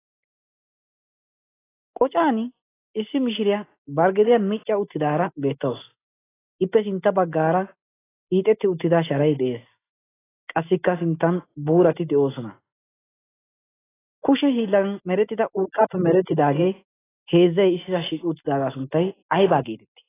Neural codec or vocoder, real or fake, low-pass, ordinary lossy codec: none; real; 3.6 kHz; AAC, 16 kbps